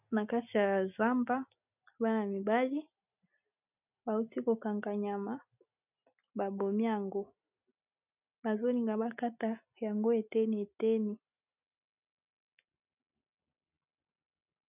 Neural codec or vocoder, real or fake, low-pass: none; real; 3.6 kHz